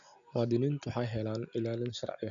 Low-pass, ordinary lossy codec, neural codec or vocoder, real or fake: 7.2 kHz; none; none; real